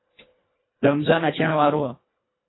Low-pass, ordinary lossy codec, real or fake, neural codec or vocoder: 7.2 kHz; AAC, 16 kbps; fake; codec, 24 kHz, 1.5 kbps, HILCodec